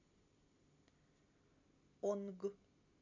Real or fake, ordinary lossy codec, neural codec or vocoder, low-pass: real; none; none; 7.2 kHz